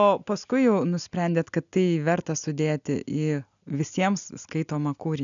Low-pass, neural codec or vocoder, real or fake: 7.2 kHz; none; real